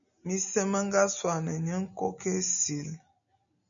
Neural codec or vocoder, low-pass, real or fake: none; 7.2 kHz; real